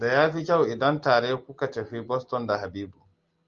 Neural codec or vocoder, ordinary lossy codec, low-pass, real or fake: none; Opus, 32 kbps; 7.2 kHz; real